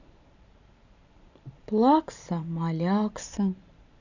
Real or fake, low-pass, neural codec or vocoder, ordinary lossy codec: fake; 7.2 kHz; vocoder, 44.1 kHz, 128 mel bands every 512 samples, BigVGAN v2; none